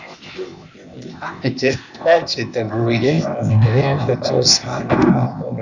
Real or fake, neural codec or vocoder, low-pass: fake; codec, 16 kHz, 0.8 kbps, ZipCodec; 7.2 kHz